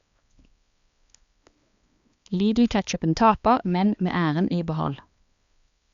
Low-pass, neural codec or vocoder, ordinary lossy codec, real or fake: 7.2 kHz; codec, 16 kHz, 2 kbps, X-Codec, HuBERT features, trained on balanced general audio; Opus, 64 kbps; fake